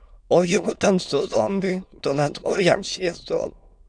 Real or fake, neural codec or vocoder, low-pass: fake; autoencoder, 22.05 kHz, a latent of 192 numbers a frame, VITS, trained on many speakers; 9.9 kHz